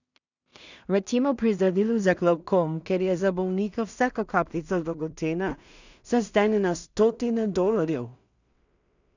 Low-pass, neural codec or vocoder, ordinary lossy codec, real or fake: 7.2 kHz; codec, 16 kHz in and 24 kHz out, 0.4 kbps, LongCat-Audio-Codec, two codebook decoder; none; fake